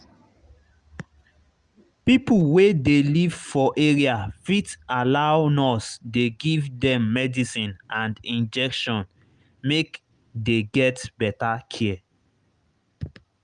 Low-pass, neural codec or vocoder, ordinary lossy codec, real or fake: 9.9 kHz; none; Opus, 32 kbps; real